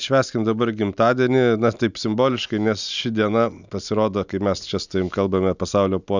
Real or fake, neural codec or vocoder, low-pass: real; none; 7.2 kHz